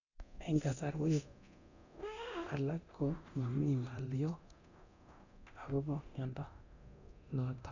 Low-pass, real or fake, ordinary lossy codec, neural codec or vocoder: 7.2 kHz; fake; none; codec, 24 kHz, 0.9 kbps, DualCodec